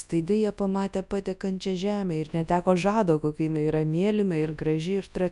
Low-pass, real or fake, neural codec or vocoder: 10.8 kHz; fake; codec, 24 kHz, 0.9 kbps, WavTokenizer, large speech release